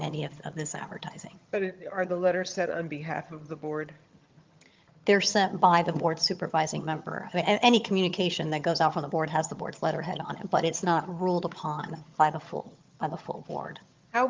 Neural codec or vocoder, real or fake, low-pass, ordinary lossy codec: vocoder, 22.05 kHz, 80 mel bands, HiFi-GAN; fake; 7.2 kHz; Opus, 32 kbps